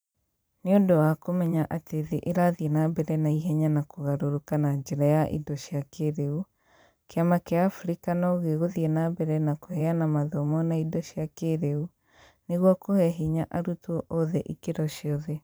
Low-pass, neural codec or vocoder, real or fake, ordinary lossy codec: none; vocoder, 44.1 kHz, 128 mel bands every 512 samples, BigVGAN v2; fake; none